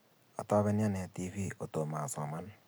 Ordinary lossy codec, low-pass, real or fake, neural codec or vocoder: none; none; real; none